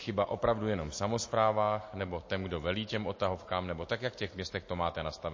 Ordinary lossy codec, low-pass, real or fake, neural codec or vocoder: MP3, 32 kbps; 7.2 kHz; real; none